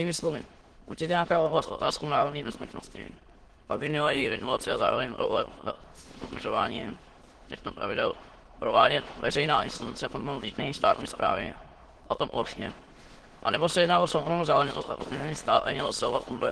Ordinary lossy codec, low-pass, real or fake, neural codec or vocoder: Opus, 16 kbps; 9.9 kHz; fake; autoencoder, 22.05 kHz, a latent of 192 numbers a frame, VITS, trained on many speakers